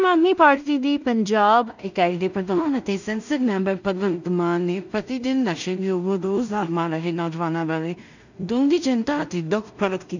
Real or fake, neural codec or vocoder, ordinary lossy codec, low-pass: fake; codec, 16 kHz in and 24 kHz out, 0.4 kbps, LongCat-Audio-Codec, two codebook decoder; none; 7.2 kHz